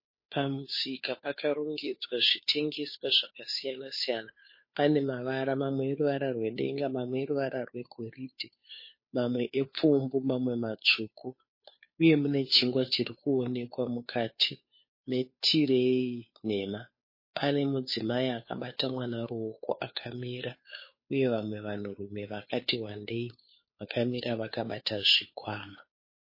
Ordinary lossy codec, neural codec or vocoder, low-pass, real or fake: MP3, 24 kbps; codec, 16 kHz, 2 kbps, FunCodec, trained on Chinese and English, 25 frames a second; 5.4 kHz; fake